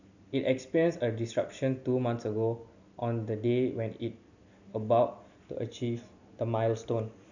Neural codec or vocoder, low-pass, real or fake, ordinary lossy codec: none; 7.2 kHz; real; none